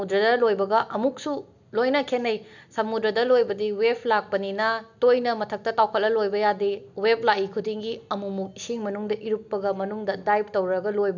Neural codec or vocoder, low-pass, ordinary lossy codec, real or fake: none; 7.2 kHz; none; real